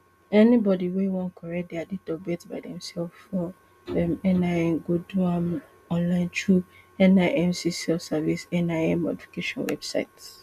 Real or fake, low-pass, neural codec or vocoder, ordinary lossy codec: real; 14.4 kHz; none; none